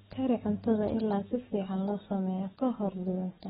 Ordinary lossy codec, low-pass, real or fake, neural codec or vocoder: AAC, 16 kbps; 19.8 kHz; fake; codec, 44.1 kHz, 7.8 kbps, DAC